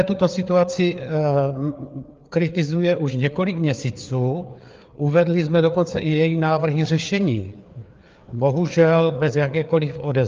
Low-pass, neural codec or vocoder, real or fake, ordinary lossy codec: 7.2 kHz; codec, 16 kHz, 4 kbps, FreqCodec, larger model; fake; Opus, 32 kbps